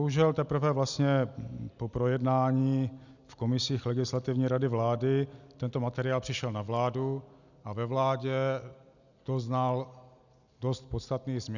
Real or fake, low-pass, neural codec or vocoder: real; 7.2 kHz; none